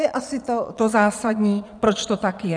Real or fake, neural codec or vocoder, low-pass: fake; vocoder, 22.05 kHz, 80 mel bands, Vocos; 9.9 kHz